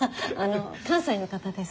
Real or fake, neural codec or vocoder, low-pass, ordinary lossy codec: real; none; none; none